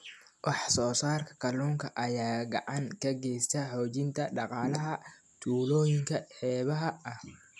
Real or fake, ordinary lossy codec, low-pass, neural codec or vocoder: real; none; none; none